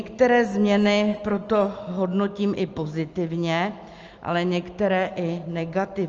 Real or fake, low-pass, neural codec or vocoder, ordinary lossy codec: real; 7.2 kHz; none; Opus, 24 kbps